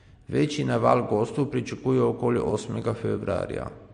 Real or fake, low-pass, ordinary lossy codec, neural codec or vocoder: real; 9.9 kHz; MP3, 48 kbps; none